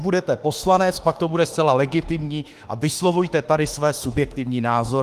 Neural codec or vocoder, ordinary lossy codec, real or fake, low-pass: autoencoder, 48 kHz, 32 numbers a frame, DAC-VAE, trained on Japanese speech; Opus, 24 kbps; fake; 14.4 kHz